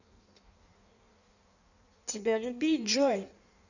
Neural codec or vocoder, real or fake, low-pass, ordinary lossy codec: codec, 16 kHz in and 24 kHz out, 1.1 kbps, FireRedTTS-2 codec; fake; 7.2 kHz; none